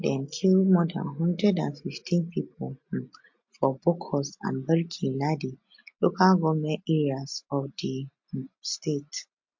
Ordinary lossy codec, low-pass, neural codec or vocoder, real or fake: MP3, 48 kbps; 7.2 kHz; none; real